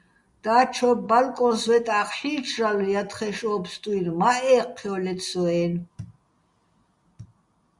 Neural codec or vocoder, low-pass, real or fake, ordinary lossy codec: none; 10.8 kHz; real; Opus, 64 kbps